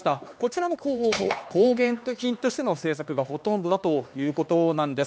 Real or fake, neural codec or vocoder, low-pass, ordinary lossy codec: fake; codec, 16 kHz, 2 kbps, X-Codec, HuBERT features, trained on LibriSpeech; none; none